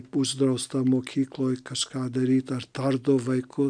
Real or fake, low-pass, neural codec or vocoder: real; 9.9 kHz; none